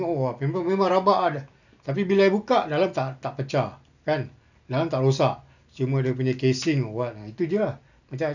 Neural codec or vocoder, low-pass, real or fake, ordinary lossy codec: none; 7.2 kHz; real; none